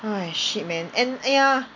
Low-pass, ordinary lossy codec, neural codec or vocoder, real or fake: 7.2 kHz; MP3, 64 kbps; none; real